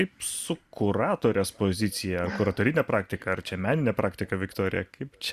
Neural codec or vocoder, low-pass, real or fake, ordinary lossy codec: none; 14.4 kHz; real; Opus, 64 kbps